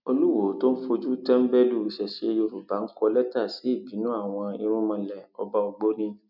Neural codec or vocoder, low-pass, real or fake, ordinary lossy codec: none; 5.4 kHz; real; none